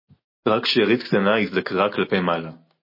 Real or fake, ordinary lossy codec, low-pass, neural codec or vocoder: real; MP3, 24 kbps; 5.4 kHz; none